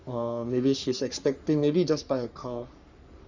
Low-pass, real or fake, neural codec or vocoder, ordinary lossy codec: 7.2 kHz; fake; codec, 44.1 kHz, 3.4 kbps, Pupu-Codec; Opus, 64 kbps